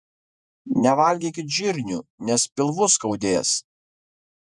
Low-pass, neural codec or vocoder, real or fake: 10.8 kHz; vocoder, 48 kHz, 128 mel bands, Vocos; fake